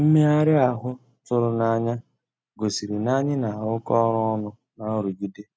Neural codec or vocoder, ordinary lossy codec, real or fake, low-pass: none; none; real; none